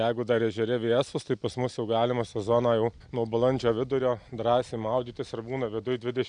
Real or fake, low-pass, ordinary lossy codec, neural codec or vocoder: real; 9.9 kHz; MP3, 96 kbps; none